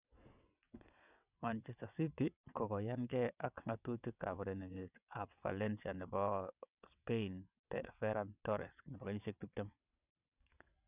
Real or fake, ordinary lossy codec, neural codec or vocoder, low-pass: fake; none; vocoder, 22.05 kHz, 80 mel bands, WaveNeXt; 3.6 kHz